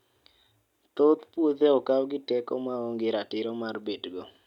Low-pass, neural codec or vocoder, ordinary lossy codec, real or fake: 19.8 kHz; none; none; real